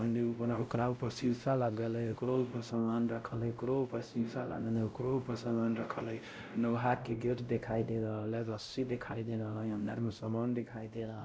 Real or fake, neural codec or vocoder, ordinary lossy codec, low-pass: fake; codec, 16 kHz, 0.5 kbps, X-Codec, WavLM features, trained on Multilingual LibriSpeech; none; none